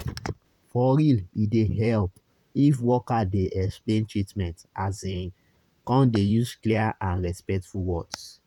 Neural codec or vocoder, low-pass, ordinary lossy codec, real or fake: vocoder, 44.1 kHz, 128 mel bands, Pupu-Vocoder; 19.8 kHz; none; fake